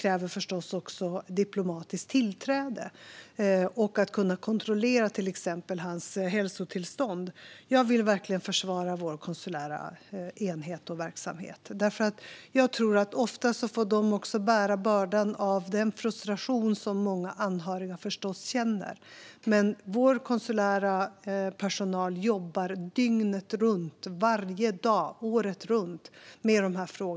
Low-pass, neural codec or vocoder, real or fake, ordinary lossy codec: none; none; real; none